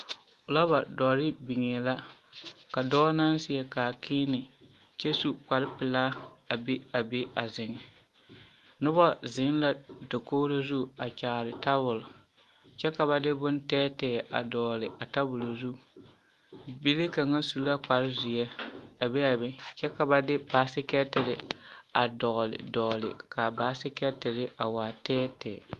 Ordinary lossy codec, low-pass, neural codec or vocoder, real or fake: Opus, 32 kbps; 14.4 kHz; autoencoder, 48 kHz, 128 numbers a frame, DAC-VAE, trained on Japanese speech; fake